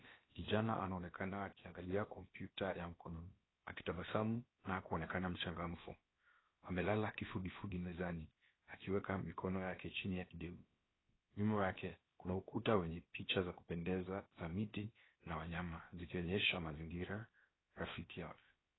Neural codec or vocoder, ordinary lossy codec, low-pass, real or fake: codec, 16 kHz, about 1 kbps, DyCAST, with the encoder's durations; AAC, 16 kbps; 7.2 kHz; fake